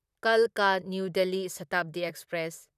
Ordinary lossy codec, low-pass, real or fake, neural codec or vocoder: none; 14.4 kHz; fake; vocoder, 44.1 kHz, 128 mel bands, Pupu-Vocoder